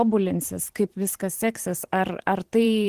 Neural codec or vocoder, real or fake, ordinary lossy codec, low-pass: vocoder, 44.1 kHz, 128 mel bands every 512 samples, BigVGAN v2; fake; Opus, 16 kbps; 14.4 kHz